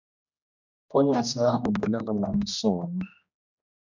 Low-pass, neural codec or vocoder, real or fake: 7.2 kHz; codec, 16 kHz, 1 kbps, X-Codec, HuBERT features, trained on general audio; fake